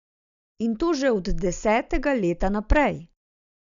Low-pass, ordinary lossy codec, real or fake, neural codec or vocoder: 7.2 kHz; none; real; none